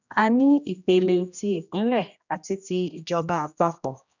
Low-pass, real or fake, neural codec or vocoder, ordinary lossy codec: 7.2 kHz; fake; codec, 16 kHz, 1 kbps, X-Codec, HuBERT features, trained on general audio; none